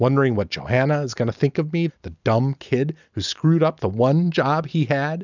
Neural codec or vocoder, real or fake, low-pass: none; real; 7.2 kHz